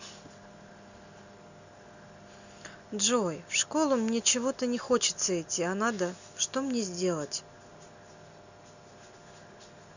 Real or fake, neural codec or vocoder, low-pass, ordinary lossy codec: real; none; 7.2 kHz; none